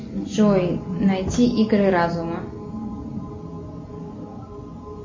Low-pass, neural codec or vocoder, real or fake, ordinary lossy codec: 7.2 kHz; none; real; MP3, 32 kbps